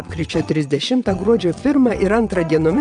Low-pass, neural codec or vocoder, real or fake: 9.9 kHz; vocoder, 22.05 kHz, 80 mel bands, WaveNeXt; fake